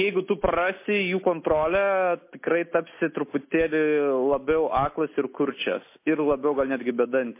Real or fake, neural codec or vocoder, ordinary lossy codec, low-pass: real; none; MP3, 24 kbps; 3.6 kHz